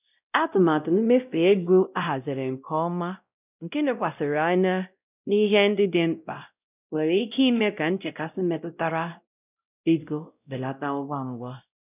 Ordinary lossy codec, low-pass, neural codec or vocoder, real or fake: none; 3.6 kHz; codec, 16 kHz, 0.5 kbps, X-Codec, WavLM features, trained on Multilingual LibriSpeech; fake